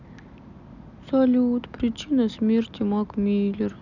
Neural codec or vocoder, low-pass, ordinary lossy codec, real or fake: none; 7.2 kHz; none; real